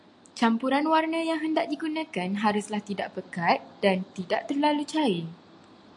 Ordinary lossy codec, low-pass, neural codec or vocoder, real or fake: AAC, 64 kbps; 10.8 kHz; none; real